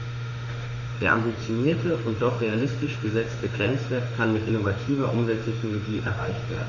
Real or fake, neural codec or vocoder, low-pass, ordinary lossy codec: fake; autoencoder, 48 kHz, 32 numbers a frame, DAC-VAE, trained on Japanese speech; 7.2 kHz; none